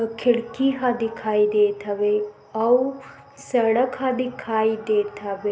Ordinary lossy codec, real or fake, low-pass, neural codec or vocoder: none; real; none; none